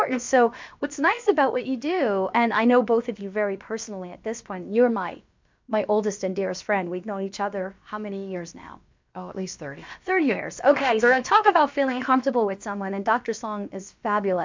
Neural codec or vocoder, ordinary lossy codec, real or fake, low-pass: codec, 16 kHz, about 1 kbps, DyCAST, with the encoder's durations; MP3, 64 kbps; fake; 7.2 kHz